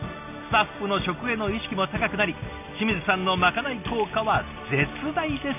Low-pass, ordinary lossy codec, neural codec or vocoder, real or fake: 3.6 kHz; none; none; real